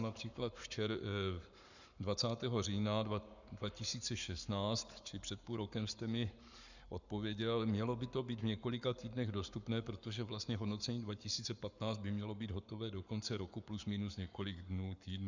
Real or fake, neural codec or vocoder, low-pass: fake; codec, 44.1 kHz, 7.8 kbps, Pupu-Codec; 7.2 kHz